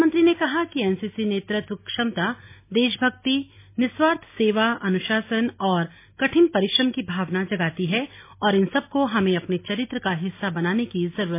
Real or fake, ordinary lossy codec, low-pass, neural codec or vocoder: real; MP3, 24 kbps; 3.6 kHz; none